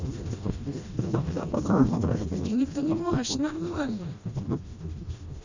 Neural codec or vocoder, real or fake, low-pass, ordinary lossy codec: codec, 16 kHz, 1 kbps, FreqCodec, smaller model; fake; 7.2 kHz; Opus, 64 kbps